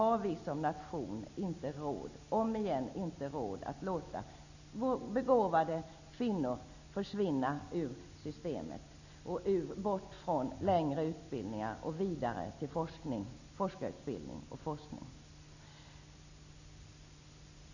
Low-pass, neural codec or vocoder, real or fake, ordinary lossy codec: 7.2 kHz; none; real; none